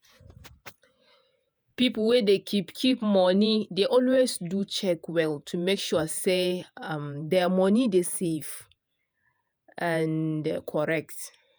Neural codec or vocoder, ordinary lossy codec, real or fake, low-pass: vocoder, 48 kHz, 128 mel bands, Vocos; none; fake; none